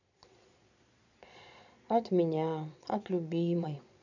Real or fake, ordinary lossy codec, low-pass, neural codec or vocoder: fake; none; 7.2 kHz; vocoder, 22.05 kHz, 80 mel bands, Vocos